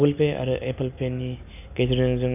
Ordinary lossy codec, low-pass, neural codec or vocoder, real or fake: none; 3.6 kHz; none; real